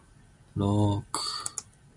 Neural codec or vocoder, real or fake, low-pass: none; real; 10.8 kHz